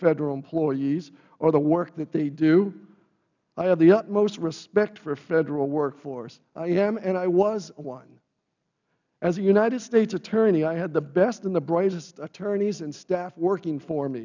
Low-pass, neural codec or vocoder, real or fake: 7.2 kHz; none; real